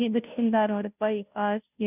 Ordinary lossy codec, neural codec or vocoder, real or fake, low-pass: none; codec, 16 kHz, 0.5 kbps, FunCodec, trained on Chinese and English, 25 frames a second; fake; 3.6 kHz